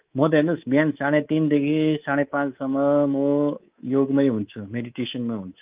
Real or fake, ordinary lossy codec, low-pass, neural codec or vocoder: fake; Opus, 32 kbps; 3.6 kHz; codec, 24 kHz, 3.1 kbps, DualCodec